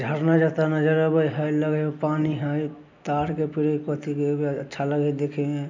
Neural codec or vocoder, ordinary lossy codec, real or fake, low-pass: none; MP3, 64 kbps; real; 7.2 kHz